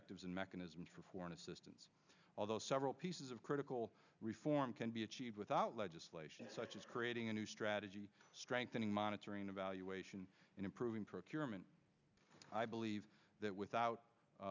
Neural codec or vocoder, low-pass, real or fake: none; 7.2 kHz; real